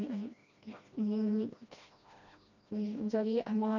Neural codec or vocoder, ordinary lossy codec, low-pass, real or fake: codec, 16 kHz, 1 kbps, FreqCodec, smaller model; none; 7.2 kHz; fake